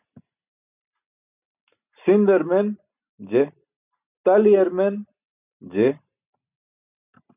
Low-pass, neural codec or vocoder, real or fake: 3.6 kHz; none; real